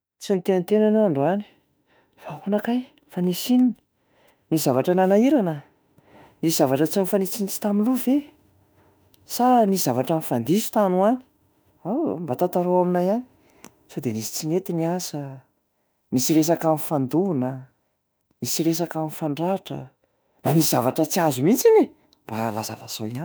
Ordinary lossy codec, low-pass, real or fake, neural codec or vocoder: none; none; fake; autoencoder, 48 kHz, 32 numbers a frame, DAC-VAE, trained on Japanese speech